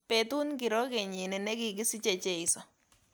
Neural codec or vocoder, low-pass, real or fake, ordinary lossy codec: none; none; real; none